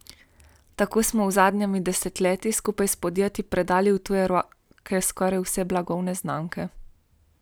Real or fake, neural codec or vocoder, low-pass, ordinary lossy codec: real; none; none; none